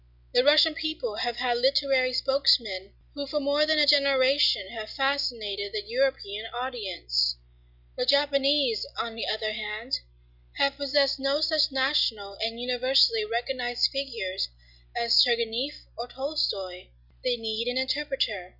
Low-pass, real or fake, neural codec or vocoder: 5.4 kHz; real; none